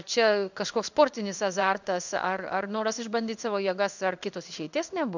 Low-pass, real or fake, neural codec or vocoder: 7.2 kHz; fake; codec, 16 kHz in and 24 kHz out, 1 kbps, XY-Tokenizer